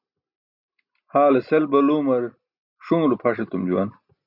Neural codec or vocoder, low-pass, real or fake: none; 5.4 kHz; real